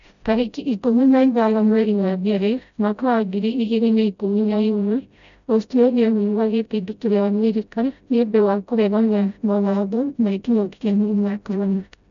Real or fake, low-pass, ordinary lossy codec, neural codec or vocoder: fake; 7.2 kHz; none; codec, 16 kHz, 0.5 kbps, FreqCodec, smaller model